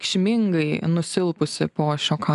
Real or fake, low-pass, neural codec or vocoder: real; 10.8 kHz; none